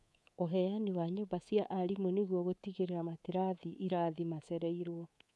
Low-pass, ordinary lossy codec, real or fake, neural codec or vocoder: none; none; fake; codec, 24 kHz, 3.1 kbps, DualCodec